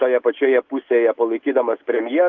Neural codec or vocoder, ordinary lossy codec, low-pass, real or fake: none; Opus, 24 kbps; 7.2 kHz; real